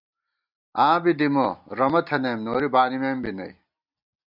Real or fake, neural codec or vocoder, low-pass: real; none; 5.4 kHz